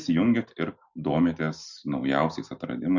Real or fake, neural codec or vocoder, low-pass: real; none; 7.2 kHz